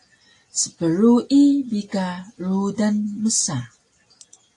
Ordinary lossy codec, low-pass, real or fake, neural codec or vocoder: AAC, 48 kbps; 10.8 kHz; real; none